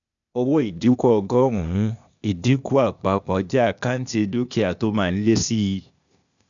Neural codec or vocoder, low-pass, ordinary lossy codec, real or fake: codec, 16 kHz, 0.8 kbps, ZipCodec; 7.2 kHz; none; fake